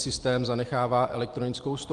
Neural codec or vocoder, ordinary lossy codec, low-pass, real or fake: none; Opus, 24 kbps; 10.8 kHz; real